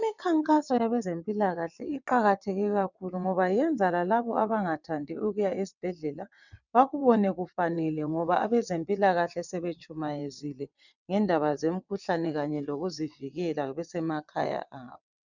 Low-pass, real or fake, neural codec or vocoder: 7.2 kHz; fake; vocoder, 22.05 kHz, 80 mel bands, WaveNeXt